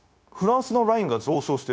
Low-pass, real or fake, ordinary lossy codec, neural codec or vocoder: none; fake; none; codec, 16 kHz, 0.9 kbps, LongCat-Audio-Codec